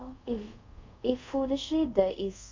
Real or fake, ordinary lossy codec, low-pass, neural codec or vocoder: fake; MP3, 64 kbps; 7.2 kHz; codec, 24 kHz, 0.5 kbps, DualCodec